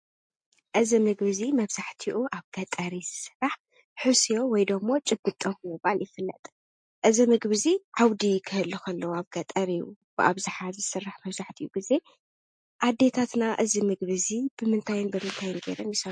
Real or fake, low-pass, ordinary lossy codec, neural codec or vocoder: real; 9.9 kHz; MP3, 48 kbps; none